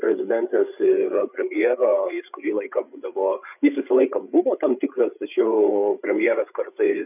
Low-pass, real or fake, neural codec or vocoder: 3.6 kHz; fake; codec, 16 kHz, 8 kbps, FreqCodec, larger model